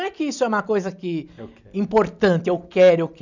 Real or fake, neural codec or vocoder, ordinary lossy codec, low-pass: real; none; none; 7.2 kHz